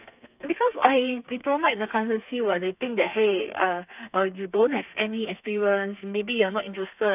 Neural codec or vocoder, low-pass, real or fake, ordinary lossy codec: codec, 32 kHz, 1.9 kbps, SNAC; 3.6 kHz; fake; none